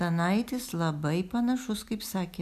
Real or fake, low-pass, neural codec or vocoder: real; 14.4 kHz; none